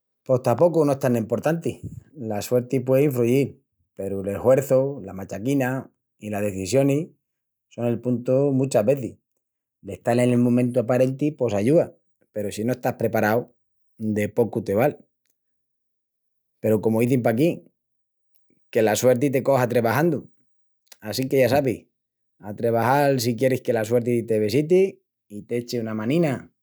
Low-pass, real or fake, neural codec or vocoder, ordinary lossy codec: none; real; none; none